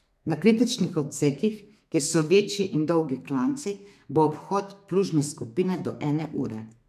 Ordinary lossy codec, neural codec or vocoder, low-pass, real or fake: MP3, 96 kbps; codec, 32 kHz, 1.9 kbps, SNAC; 14.4 kHz; fake